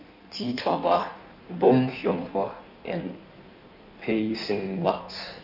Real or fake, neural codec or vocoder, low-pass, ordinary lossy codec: fake; codec, 16 kHz in and 24 kHz out, 1.1 kbps, FireRedTTS-2 codec; 5.4 kHz; none